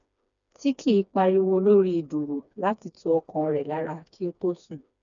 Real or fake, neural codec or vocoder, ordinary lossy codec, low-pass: fake; codec, 16 kHz, 2 kbps, FreqCodec, smaller model; none; 7.2 kHz